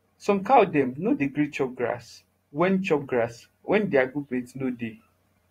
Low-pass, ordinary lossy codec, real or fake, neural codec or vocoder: 19.8 kHz; AAC, 48 kbps; fake; vocoder, 44.1 kHz, 128 mel bands every 512 samples, BigVGAN v2